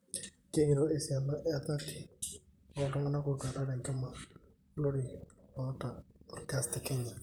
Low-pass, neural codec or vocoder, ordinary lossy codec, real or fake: none; vocoder, 44.1 kHz, 128 mel bands, Pupu-Vocoder; none; fake